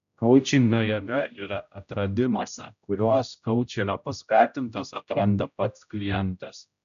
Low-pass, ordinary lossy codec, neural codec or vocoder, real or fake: 7.2 kHz; MP3, 96 kbps; codec, 16 kHz, 0.5 kbps, X-Codec, HuBERT features, trained on general audio; fake